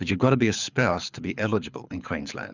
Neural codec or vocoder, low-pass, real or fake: codec, 24 kHz, 6 kbps, HILCodec; 7.2 kHz; fake